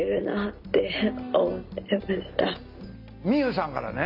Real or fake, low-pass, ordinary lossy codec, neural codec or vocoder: real; 5.4 kHz; none; none